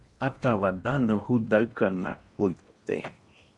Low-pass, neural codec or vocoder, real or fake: 10.8 kHz; codec, 16 kHz in and 24 kHz out, 0.8 kbps, FocalCodec, streaming, 65536 codes; fake